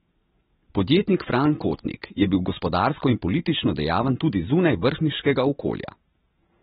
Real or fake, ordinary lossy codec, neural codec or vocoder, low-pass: real; AAC, 16 kbps; none; 14.4 kHz